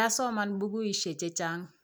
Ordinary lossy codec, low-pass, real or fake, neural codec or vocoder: none; none; real; none